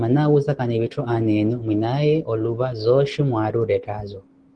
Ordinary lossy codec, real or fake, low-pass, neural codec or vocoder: Opus, 16 kbps; real; 9.9 kHz; none